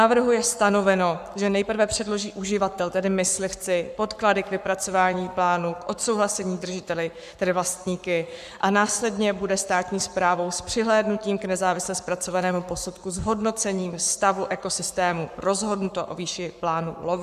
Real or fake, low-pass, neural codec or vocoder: fake; 14.4 kHz; codec, 44.1 kHz, 7.8 kbps, Pupu-Codec